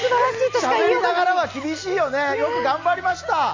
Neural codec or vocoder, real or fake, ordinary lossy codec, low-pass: none; real; MP3, 64 kbps; 7.2 kHz